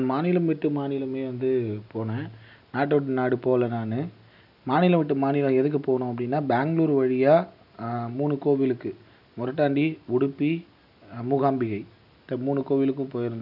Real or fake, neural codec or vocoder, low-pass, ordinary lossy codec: real; none; 5.4 kHz; none